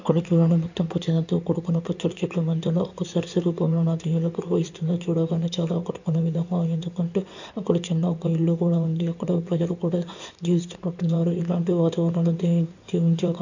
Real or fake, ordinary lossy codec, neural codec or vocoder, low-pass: fake; none; codec, 16 kHz in and 24 kHz out, 2.2 kbps, FireRedTTS-2 codec; 7.2 kHz